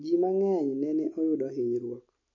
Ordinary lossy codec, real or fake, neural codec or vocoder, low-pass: MP3, 32 kbps; real; none; 7.2 kHz